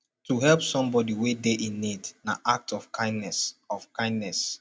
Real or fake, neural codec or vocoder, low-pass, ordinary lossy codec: real; none; none; none